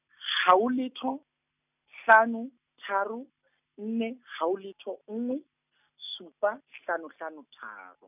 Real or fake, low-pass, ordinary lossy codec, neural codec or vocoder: real; 3.6 kHz; none; none